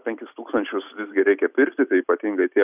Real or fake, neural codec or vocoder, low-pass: real; none; 3.6 kHz